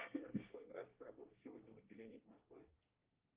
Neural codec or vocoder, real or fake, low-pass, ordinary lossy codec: codec, 24 kHz, 1 kbps, SNAC; fake; 3.6 kHz; Opus, 32 kbps